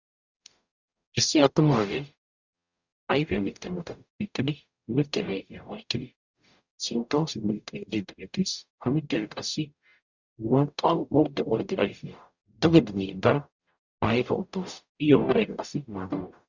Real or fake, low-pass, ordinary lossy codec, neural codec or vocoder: fake; 7.2 kHz; Opus, 64 kbps; codec, 44.1 kHz, 0.9 kbps, DAC